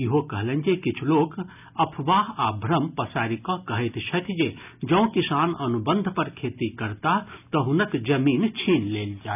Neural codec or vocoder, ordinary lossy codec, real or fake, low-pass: none; none; real; 3.6 kHz